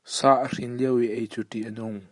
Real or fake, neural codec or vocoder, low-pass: fake; vocoder, 44.1 kHz, 128 mel bands every 512 samples, BigVGAN v2; 10.8 kHz